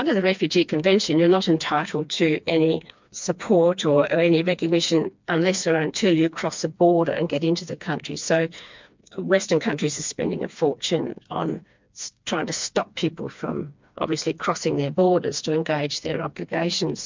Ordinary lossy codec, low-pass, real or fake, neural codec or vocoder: MP3, 64 kbps; 7.2 kHz; fake; codec, 16 kHz, 2 kbps, FreqCodec, smaller model